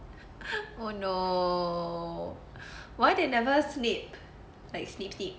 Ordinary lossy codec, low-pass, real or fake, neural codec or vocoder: none; none; real; none